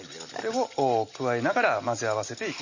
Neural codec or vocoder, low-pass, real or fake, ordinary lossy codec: none; 7.2 kHz; real; MP3, 32 kbps